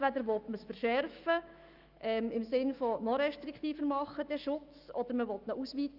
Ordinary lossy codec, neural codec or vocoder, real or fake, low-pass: Opus, 32 kbps; autoencoder, 48 kHz, 128 numbers a frame, DAC-VAE, trained on Japanese speech; fake; 5.4 kHz